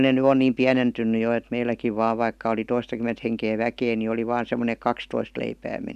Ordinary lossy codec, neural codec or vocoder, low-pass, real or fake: none; none; 14.4 kHz; real